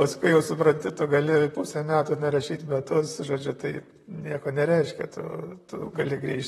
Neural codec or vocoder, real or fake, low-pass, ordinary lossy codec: vocoder, 44.1 kHz, 128 mel bands, Pupu-Vocoder; fake; 19.8 kHz; AAC, 32 kbps